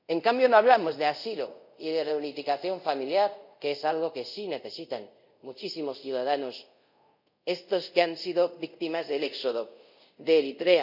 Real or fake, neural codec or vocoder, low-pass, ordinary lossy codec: fake; codec, 24 kHz, 0.5 kbps, DualCodec; 5.4 kHz; none